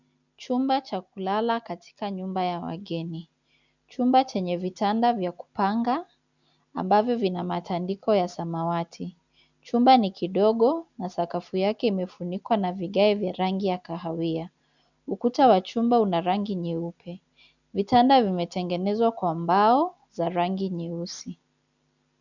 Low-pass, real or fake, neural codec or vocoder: 7.2 kHz; real; none